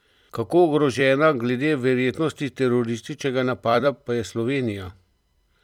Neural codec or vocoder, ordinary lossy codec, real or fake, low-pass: vocoder, 44.1 kHz, 128 mel bands every 256 samples, BigVGAN v2; none; fake; 19.8 kHz